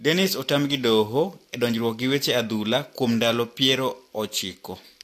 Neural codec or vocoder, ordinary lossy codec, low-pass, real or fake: none; AAC, 64 kbps; 14.4 kHz; real